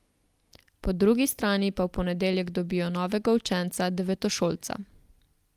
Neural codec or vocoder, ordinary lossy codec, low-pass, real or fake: none; Opus, 32 kbps; 14.4 kHz; real